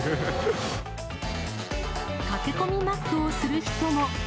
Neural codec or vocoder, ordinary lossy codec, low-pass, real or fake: none; none; none; real